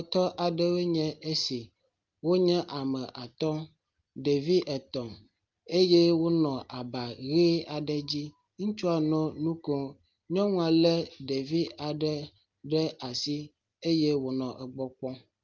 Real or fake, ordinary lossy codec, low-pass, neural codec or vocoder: real; Opus, 32 kbps; 7.2 kHz; none